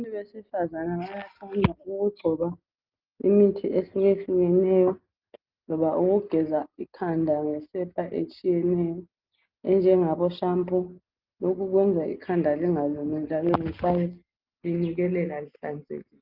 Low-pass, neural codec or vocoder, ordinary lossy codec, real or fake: 5.4 kHz; none; Opus, 24 kbps; real